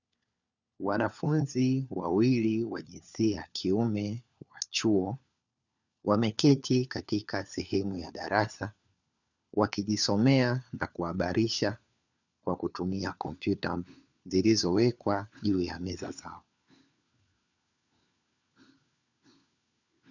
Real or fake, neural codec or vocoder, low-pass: fake; codec, 16 kHz, 4 kbps, FunCodec, trained on LibriTTS, 50 frames a second; 7.2 kHz